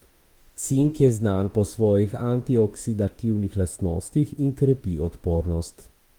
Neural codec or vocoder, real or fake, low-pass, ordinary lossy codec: autoencoder, 48 kHz, 32 numbers a frame, DAC-VAE, trained on Japanese speech; fake; 19.8 kHz; Opus, 24 kbps